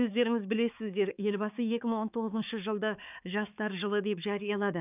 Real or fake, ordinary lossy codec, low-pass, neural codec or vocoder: fake; none; 3.6 kHz; codec, 16 kHz, 4 kbps, X-Codec, HuBERT features, trained on balanced general audio